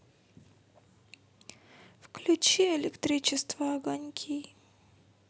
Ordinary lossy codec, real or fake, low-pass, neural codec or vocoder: none; real; none; none